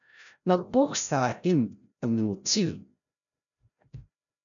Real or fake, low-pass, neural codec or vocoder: fake; 7.2 kHz; codec, 16 kHz, 0.5 kbps, FreqCodec, larger model